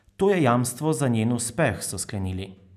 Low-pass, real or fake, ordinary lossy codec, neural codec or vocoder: 14.4 kHz; real; none; none